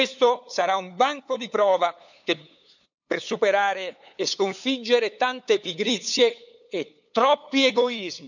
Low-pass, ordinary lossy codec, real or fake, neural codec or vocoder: 7.2 kHz; none; fake; codec, 16 kHz, 8 kbps, FunCodec, trained on LibriTTS, 25 frames a second